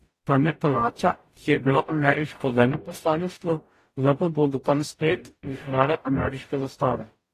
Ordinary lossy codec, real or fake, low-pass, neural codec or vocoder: AAC, 48 kbps; fake; 14.4 kHz; codec, 44.1 kHz, 0.9 kbps, DAC